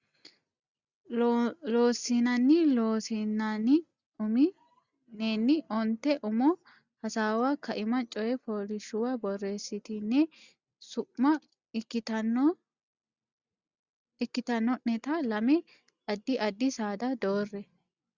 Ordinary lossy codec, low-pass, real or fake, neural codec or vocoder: Opus, 64 kbps; 7.2 kHz; real; none